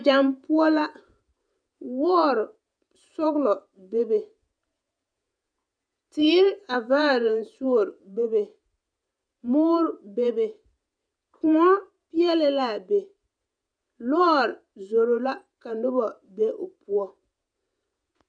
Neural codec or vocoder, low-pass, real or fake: vocoder, 48 kHz, 128 mel bands, Vocos; 9.9 kHz; fake